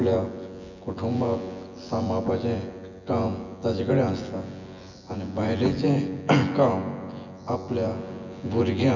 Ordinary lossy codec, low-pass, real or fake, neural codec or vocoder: none; 7.2 kHz; fake; vocoder, 24 kHz, 100 mel bands, Vocos